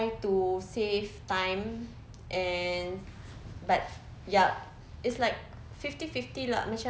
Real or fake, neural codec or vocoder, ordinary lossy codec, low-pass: real; none; none; none